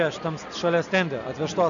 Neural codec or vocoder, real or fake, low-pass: none; real; 7.2 kHz